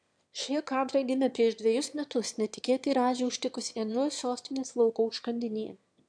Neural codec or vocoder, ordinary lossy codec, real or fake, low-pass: autoencoder, 22.05 kHz, a latent of 192 numbers a frame, VITS, trained on one speaker; AAC, 64 kbps; fake; 9.9 kHz